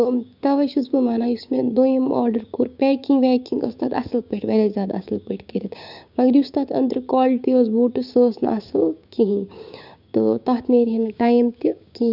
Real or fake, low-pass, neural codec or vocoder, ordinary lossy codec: fake; 5.4 kHz; codec, 16 kHz, 6 kbps, DAC; none